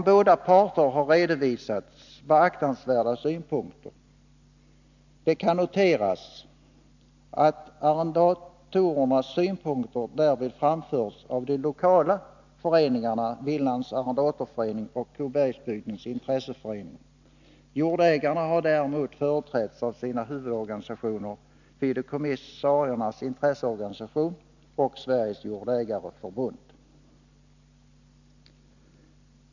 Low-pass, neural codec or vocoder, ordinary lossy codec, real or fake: 7.2 kHz; none; none; real